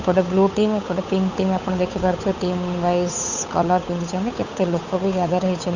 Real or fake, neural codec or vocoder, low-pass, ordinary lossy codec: fake; codec, 16 kHz, 8 kbps, FunCodec, trained on Chinese and English, 25 frames a second; 7.2 kHz; none